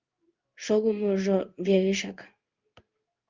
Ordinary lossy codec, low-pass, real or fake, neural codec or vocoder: Opus, 24 kbps; 7.2 kHz; fake; codec, 16 kHz in and 24 kHz out, 1 kbps, XY-Tokenizer